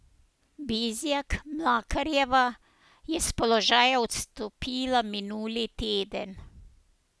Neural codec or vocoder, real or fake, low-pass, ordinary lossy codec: none; real; none; none